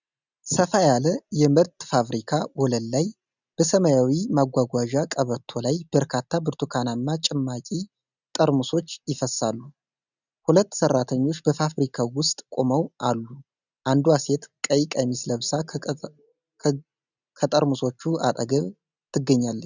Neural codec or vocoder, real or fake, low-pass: none; real; 7.2 kHz